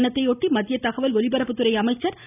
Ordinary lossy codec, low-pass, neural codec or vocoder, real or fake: none; 3.6 kHz; none; real